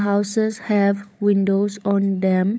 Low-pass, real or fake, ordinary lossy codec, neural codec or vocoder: none; fake; none; codec, 16 kHz, 16 kbps, FunCodec, trained on LibriTTS, 50 frames a second